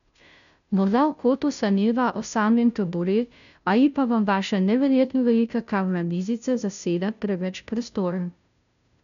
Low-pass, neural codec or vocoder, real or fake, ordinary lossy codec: 7.2 kHz; codec, 16 kHz, 0.5 kbps, FunCodec, trained on Chinese and English, 25 frames a second; fake; none